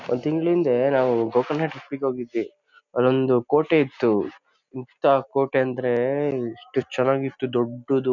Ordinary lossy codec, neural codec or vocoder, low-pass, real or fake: none; none; 7.2 kHz; real